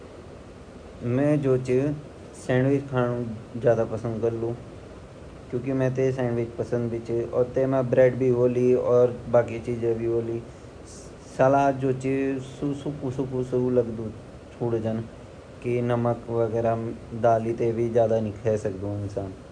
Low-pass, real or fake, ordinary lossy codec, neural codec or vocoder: 9.9 kHz; real; none; none